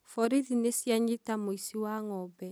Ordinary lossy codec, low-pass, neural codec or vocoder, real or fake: none; none; none; real